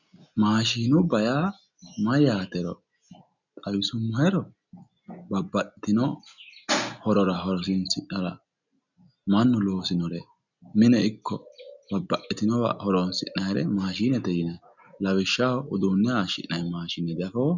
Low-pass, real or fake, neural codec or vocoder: 7.2 kHz; real; none